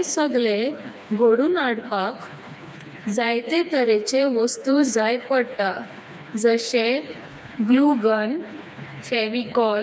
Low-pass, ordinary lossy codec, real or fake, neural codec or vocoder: none; none; fake; codec, 16 kHz, 2 kbps, FreqCodec, smaller model